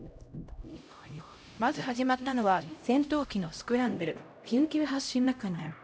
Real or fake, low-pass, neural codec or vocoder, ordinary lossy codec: fake; none; codec, 16 kHz, 0.5 kbps, X-Codec, HuBERT features, trained on LibriSpeech; none